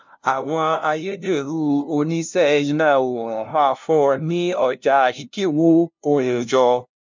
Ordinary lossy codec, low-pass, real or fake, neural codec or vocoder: MP3, 64 kbps; 7.2 kHz; fake; codec, 16 kHz, 0.5 kbps, FunCodec, trained on LibriTTS, 25 frames a second